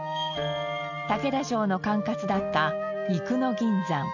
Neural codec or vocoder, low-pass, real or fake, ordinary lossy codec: none; 7.2 kHz; real; none